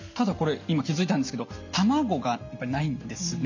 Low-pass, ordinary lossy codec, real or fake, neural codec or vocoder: 7.2 kHz; none; real; none